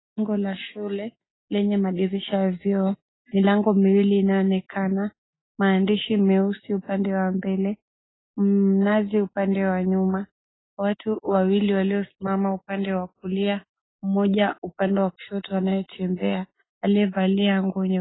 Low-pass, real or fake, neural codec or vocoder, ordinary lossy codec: 7.2 kHz; real; none; AAC, 16 kbps